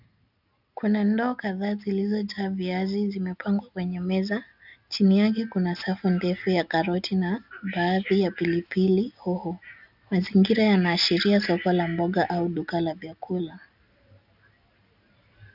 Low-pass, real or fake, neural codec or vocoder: 5.4 kHz; real; none